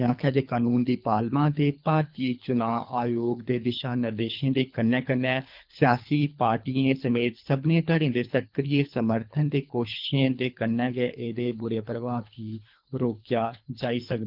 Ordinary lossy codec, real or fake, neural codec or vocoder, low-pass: Opus, 24 kbps; fake; codec, 24 kHz, 3 kbps, HILCodec; 5.4 kHz